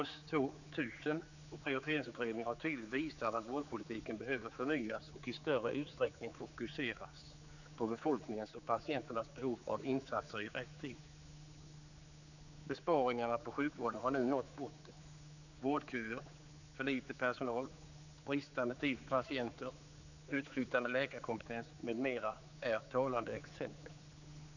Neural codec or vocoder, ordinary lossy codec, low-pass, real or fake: codec, 16 kHz, 4 kbps, X-Codec, HuBERT features, trained on general audio; none; 7.2 kHz; fake